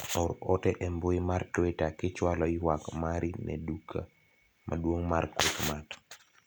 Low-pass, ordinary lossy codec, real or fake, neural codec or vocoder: none; none; real; none